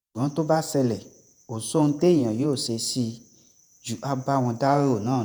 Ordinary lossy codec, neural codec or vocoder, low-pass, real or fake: none; vocoder, 48 kHz, 128 mel bands, Vocos; none; fake